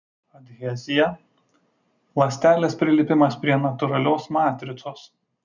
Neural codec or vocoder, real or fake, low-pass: none; real; 7.2 kHz